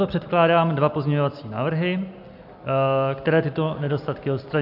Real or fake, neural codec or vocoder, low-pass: real; none; 5.4 kHz